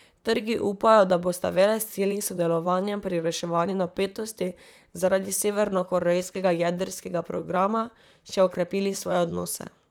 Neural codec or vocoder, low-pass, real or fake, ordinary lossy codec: vocoder, 44.1 kHz, 128 mel bands, Pupu-Vocoder; 19.8 kHz; fake; none